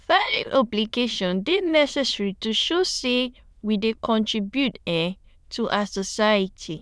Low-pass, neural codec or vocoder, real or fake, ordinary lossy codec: none; autoencoder, 22.05 kHz, a latent of 192 numbers a frame, VITS, trained on many speakers; fake; none